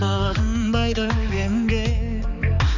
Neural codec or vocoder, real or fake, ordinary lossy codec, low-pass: codec, 16 kHz, 4 kbps, X-Codec, HuBERT features, trained on balanced general audio; fake; none; 7.2 kHz